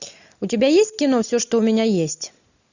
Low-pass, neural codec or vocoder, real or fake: 7.2 kHz; none; real